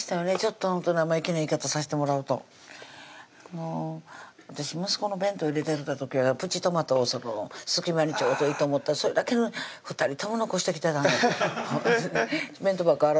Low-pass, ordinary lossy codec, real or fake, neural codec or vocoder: none; none; real; none